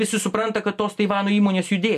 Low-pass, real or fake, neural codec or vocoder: 14.4 kHz; real; none